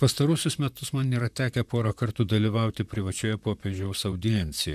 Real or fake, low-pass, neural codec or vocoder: fake; 14.4 kHz; vocoder, 44.1 kHz, 128 mel bands, Pupu-Vocoder